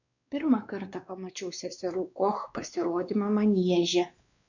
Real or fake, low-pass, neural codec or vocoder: fake; 7.2 kHz; codec, 16 kHz, 2 kbps, X-Codec, WavLM features, trained on Multilingual LibriSpeech